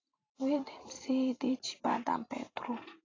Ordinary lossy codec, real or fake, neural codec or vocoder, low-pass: AAC, 32 kbps; fake; vocoder, 44.1 kHz, 128 mel bands every 512 samples, BigVGAN v2; 7.2 kHz